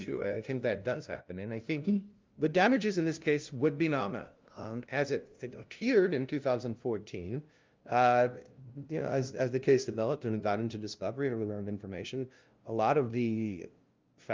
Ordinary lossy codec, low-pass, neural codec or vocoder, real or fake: Opus, 24 kbps; 7.2 kHz; codec, 16 kHz, 0.5 kbps, FunCodec, trained on LibriTTS, 25 frames a second; fake